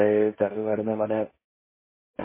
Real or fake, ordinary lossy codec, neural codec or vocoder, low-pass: fake; MP3, 16 kbps; codec, 16 kHz, 1.1 kbps, Voila-Tokenizer; 3.6 kHz